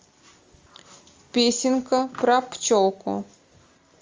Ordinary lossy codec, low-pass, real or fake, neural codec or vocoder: Opus, 32 kbps; 7.2 kHz; real; none